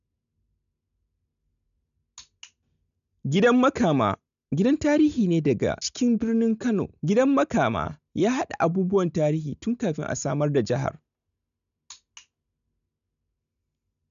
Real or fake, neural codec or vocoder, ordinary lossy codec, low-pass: real; none; none; 7.2 kHz